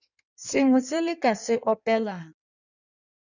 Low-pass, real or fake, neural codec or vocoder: 7.2 kHz; fake; codec, 16 kHz in and 24 kHz out, 1.1 kbps, FireRedTTS-2 codec